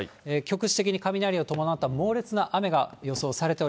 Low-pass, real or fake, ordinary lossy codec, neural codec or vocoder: none; real; none; none